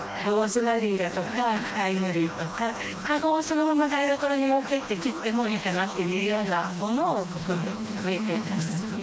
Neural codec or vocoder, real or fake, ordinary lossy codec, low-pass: codec, 16 kHz, 1 kbps, FreqCodec, smaller model; fake; none; none